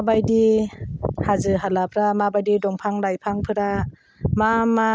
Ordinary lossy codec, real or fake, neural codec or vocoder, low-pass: none; real; none; none